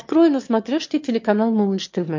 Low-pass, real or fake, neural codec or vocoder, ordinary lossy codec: 7.2 kHz; fake; autoencoder, 22.05 kHz, a latent of 192 numbers a frame, VITS, trained on one speaker; MP3, 48 kbps